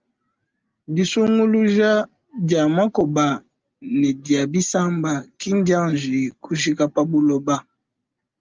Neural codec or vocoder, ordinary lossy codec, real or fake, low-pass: none; Opus, 24 kbps; real; 7.2 kHz